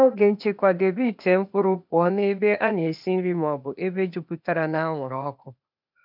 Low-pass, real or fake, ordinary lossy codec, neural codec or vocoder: 5.4 kHz; fake; none; codec, 16 kHz, 0.8 kbps, ZipCodec